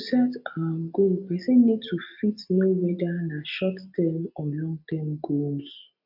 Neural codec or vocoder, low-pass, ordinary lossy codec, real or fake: none; 5.4 kHz; none; real